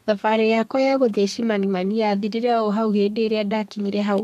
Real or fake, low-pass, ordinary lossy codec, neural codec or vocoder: fake; 14.4 kHz; none; codec, 32 kHz, 1.9 kbps, SNAC